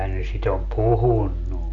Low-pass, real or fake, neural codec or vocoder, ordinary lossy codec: 7.2 kHz; real; none; none